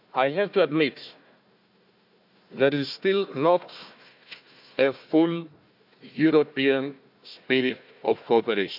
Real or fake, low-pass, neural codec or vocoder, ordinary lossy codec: fake; 5.4 kHz; codec, 16 kHz, 1 kbps, FunCodec, trained on Chinese and English, 50 frames a second; AAC, 48 kbps